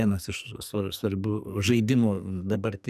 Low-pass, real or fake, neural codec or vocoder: 14.4 kHz; fake; codec, 44.1 kHz, 2.6 kbps, SNAC